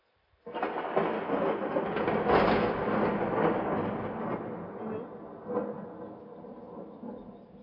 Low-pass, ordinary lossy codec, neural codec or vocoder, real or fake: 5.4 kHz; none; none; real